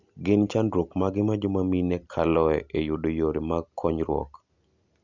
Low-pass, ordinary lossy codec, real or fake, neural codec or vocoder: 7.2 kHz; none; real; none